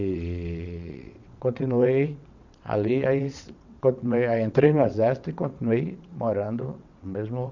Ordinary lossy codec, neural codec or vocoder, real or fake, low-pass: none; vocoder, 22.05 kHz, 80 mel bands, WaveNeXt; fake; 7.2 kHz